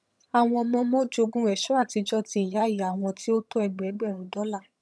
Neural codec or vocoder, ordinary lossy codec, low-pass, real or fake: vocoder, 22.05 kHz, 80 mel bands, HiFi-GAN; none; none; fake